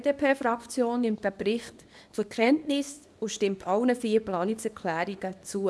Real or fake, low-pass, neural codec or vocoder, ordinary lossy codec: fake; none; codec, 24 kHz, 0.9 kbps, WavTokenizer, small release; none